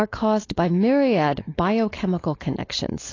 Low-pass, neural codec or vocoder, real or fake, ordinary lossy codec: 7.2 kHz; codec, 16 kHz, 4.8 kbps, FACodec; fake; AAC, 32 kbps